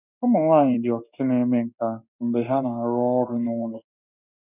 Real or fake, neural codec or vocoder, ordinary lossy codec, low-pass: fake; codec, 16 kHz in and 24 kHz out, 1 kbps, XY-Tokenizer; none; 3.6 kHz